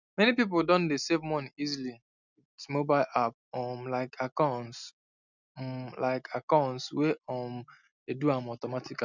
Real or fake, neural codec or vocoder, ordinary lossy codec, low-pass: real; none; none; 7.2 kHz